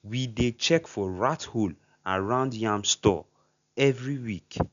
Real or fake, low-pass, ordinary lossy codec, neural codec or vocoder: real; 7.2 kHz; none; none